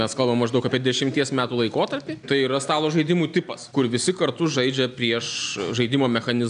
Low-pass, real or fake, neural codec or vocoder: 9.9 kHz; real; none